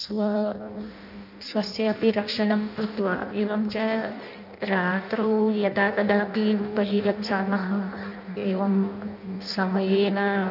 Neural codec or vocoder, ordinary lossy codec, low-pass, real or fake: codec, 16 kHz in and 24 kHz out, 0.6 kbps, FireRedTTS-2 codec; none; 5.4 kHz; fake